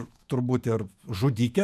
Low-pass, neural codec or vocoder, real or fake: 14.4 kHz; autoencoder, 48 kHz, 128 numbers a frame, DAC-VAE, trained on Japanese speech; fake